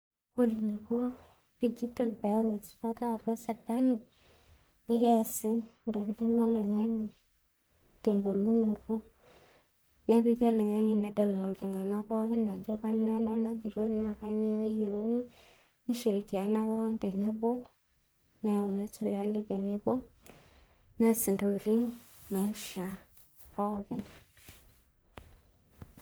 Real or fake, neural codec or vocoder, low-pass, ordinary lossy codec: fake; codec, 44.1 kHz, 1.7 kbps, Pupu-Codec; none; none